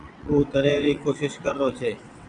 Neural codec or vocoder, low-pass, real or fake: vocoder, 22.05 kHz, 80 mel bands, WaveNeXt; 9.9 kHz; fake